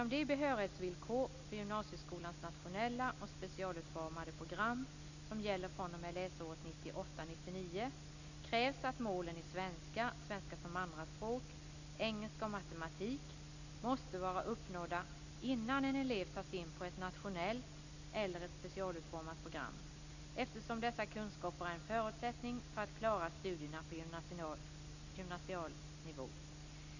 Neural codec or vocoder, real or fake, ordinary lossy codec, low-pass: none; real; none; 7.2 kHz